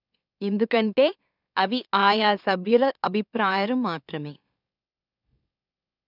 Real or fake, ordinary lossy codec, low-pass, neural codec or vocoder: fake; none; 5.4 kHz; autoencoder, 44.1 kHz, a latent of 192 numbers a frame, MeloTTS